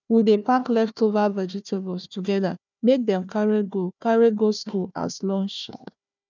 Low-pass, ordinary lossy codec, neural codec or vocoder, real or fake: 7.2 kHz; none; codec, 16 kHz, 1 kbps, FunCodec, trained on Chinese and English, 50 frames a second; fake